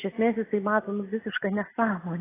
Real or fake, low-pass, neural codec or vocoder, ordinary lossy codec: real; 3.6 kHz; none; AAC, 16 kbps